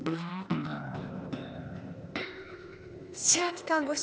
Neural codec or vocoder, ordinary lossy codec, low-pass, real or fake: codec, 16 kHz, 0.8 kbps, ZipCodec; none; none; fake